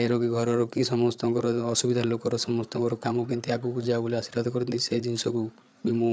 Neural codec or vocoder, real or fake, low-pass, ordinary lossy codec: codec, 16 kHz, 8 kbps, FreqCodec, larger model; fake; none; none